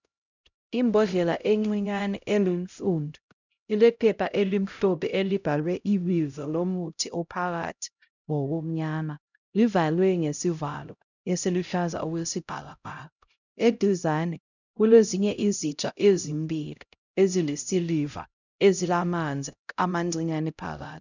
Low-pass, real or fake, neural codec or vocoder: 7.2 kHz; fake; codec, 16 kHz, 0.5 kbps, X-Codec, HuBERT features, trained on LibriSpeech